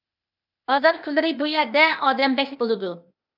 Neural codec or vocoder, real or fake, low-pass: codec, 16 kHz, 0.8 kbps, ZipCodec; fake; 5.4 kHz